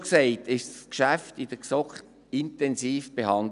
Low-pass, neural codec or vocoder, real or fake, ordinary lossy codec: 10.8 kHz; none; real; none